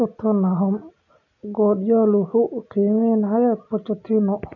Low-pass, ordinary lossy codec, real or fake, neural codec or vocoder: 7.2 kHz; none; real; none